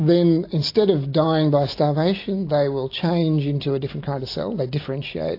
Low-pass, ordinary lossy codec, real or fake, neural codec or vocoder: 5.4 kHz; AAC, 32 kbps; real; none